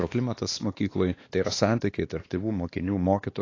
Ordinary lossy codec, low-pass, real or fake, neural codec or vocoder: AAC, 32 kbps; 7.2 kHz; fake; codec, 16 kHz, 2 kbps, X-Codec, WavLM features, trained on Multilingual LibriSpeech